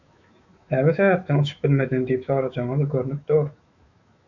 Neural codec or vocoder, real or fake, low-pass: autoencoder, 48 kHz, 128 numbers a frame, DAC-VAE, trained on Japanese speech; fake; 7.2 kHz